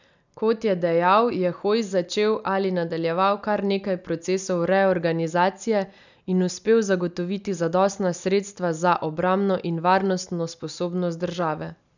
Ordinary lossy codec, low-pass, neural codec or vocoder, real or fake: none; 7.2 kHz; none; real